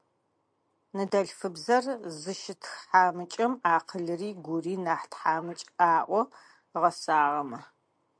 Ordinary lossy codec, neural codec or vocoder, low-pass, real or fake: MP3, 64 kbps; none; 9.9 kHz; real